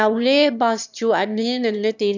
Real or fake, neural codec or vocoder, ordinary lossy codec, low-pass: fake; autoencoder, 22.05 kHz, a latent of 192 numbers a frame, VITS, trained on one speaker; none; 7.2 kHz